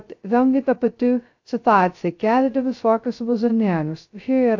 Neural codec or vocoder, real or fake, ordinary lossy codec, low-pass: codec, 16 kHz, 0.2 kbps, FocalCodec; fake; AAC, 48 kbps; 7.2 kHz